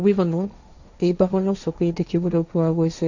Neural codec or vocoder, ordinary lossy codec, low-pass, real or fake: codec, 16 kHz, 1.1 kbps, Voila-Tokenizer; none; 7.2 kHz; fake